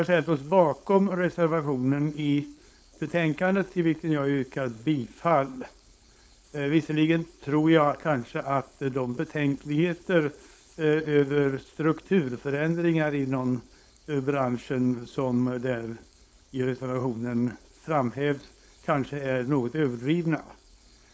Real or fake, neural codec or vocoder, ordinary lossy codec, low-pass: fake; codec, 16 kHz, 4.8 kbps, FACodec; none; none